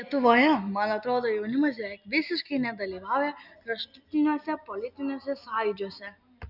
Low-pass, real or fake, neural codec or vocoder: 5.4 kHz; real; none